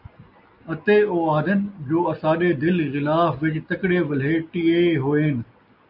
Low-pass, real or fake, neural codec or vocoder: 5.4 kHz; real; none